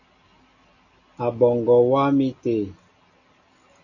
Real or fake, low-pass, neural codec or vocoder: real; 7.2 kHz; none